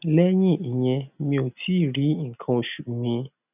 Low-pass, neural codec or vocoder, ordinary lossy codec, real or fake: 3.6 kHz; none; none; real